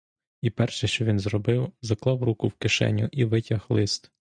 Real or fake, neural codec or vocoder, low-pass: real; none; 9.9 kHz